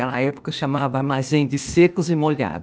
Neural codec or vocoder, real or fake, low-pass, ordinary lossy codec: codec, 16 kHz, 0.8 kbps, ZipCodec; fake; none; none